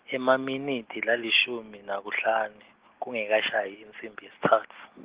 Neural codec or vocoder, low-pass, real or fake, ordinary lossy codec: none; 3.6 kHz; real; Opus, 16 kbps